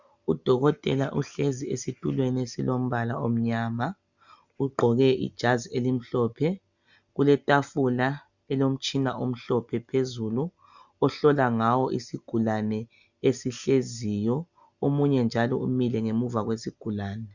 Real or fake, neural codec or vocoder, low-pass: real; none; 7.2 kHz